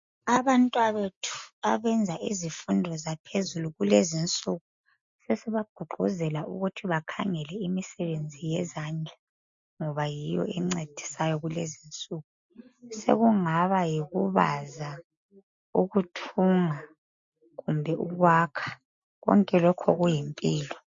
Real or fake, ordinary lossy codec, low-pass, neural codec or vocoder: real; MP3, 48 kbps; 7.2 kHz; none